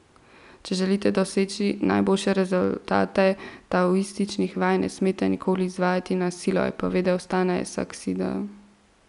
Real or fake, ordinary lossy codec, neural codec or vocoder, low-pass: real; none; none; 10.8 kHz